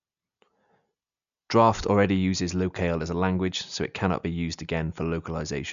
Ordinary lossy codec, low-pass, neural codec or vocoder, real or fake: none; 7.2 kHz; none; real